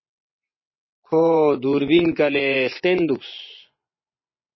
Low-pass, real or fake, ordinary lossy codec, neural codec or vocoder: 7.2 kHz; fake; MP3, 24 kbps; vocoder, 24 kHz, 100 mel bands, Vocos